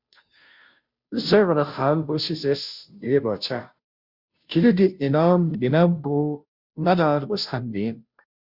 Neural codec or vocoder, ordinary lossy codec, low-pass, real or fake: codec, 16 kHz, 0.5 kbps, FunCodec, trained on Chinese and English, 25 frames a second; Opus, 64 kbps; 5.4 kHz; fake